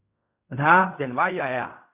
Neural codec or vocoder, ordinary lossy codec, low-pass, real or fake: codec, 16 kHz in and 24 kHz out, 0.4 kbps, LongCat-Audio-Codec, fine tuned four codebook decoder; Opus, 64 kbps; 3.6 kHz; fake